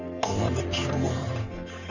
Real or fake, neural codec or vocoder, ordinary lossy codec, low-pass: fake; codec, 44.1 kHz, 3.4 kbps, Pupu-Codec; Opus, 64 kbps; 7.2 kHz